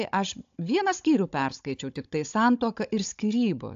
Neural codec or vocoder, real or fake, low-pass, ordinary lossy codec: codec, 16 kHz, 16 kbps, FunCodec, trained on Chinese and English, 50 frames a second; fake; 7.2 kHz; AAC, 64 kbps